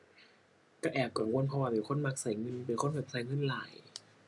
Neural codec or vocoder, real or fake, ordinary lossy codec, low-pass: none; real; none; 10.8 kHz